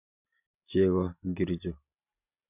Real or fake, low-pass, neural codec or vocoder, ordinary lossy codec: real; 3.6 kHz; none; none